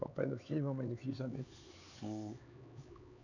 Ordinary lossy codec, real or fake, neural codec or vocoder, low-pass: none; fake; codec, 16 kHz, 4 kbps, X-Codec, HuBERT features, trained on LibriSpeech; 7.2 kHz